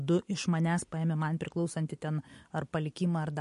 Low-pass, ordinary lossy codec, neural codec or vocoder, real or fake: 10.8 kHz; MP3, 48 kbps; none; real